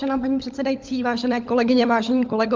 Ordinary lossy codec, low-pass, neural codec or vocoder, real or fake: Opus, 32 kbps; 7.2 kHz; codec, 16 kHz, 16 kbps, FunCodec, trained on LibriTTS, 50 frames a second; fake